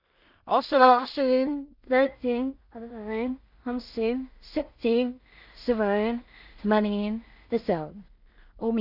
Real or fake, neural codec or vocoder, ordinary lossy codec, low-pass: fake; codec, 16 kHz in and 24 kHz out, 0.4 kbps, LongCat-Audio-Codec, two codebook decoder; none; 5.4 kHz